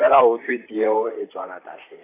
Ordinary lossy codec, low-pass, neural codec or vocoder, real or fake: AAC, 16 kbps; 3.6 kHz; vocoder, 44.1 kHz, 128 mel bands, Pupu-Vocoder; fake